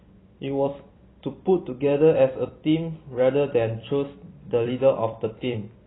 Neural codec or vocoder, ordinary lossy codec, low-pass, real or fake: vocoder, 44.1 kHz, 128 mel bands every 256 samples, BigVGAN v2; AAC, 16 kbps; 7.2 kHz; fake